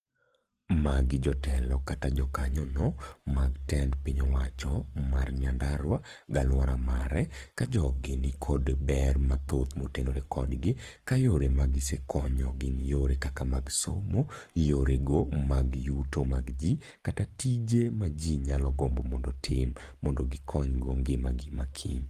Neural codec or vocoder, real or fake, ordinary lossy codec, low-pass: codec, 44.1 kHz, 7.8 kbps, Pupu-Codec; fake; Opus, 24 kbps; 14.4 kHz